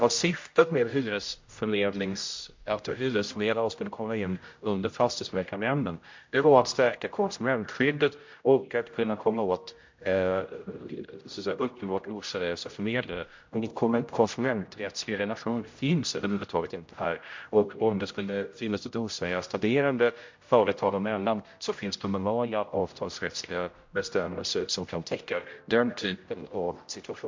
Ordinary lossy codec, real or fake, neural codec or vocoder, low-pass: MP3, 48 kbps; fake; codec, 16 kHz, 0.5 kbps, X-Codec, HuBERT features, trained on general audio; 7.2 kHz